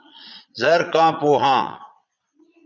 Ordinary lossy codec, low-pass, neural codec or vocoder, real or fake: MP3, 64 kbps; 7.2 kHz; vocoder, 22.05 kHz, 80 mel bands, Vocos; fake